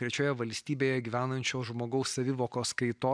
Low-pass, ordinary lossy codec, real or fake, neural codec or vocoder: 9.9 kHz; MP3, 96 kbps; real; none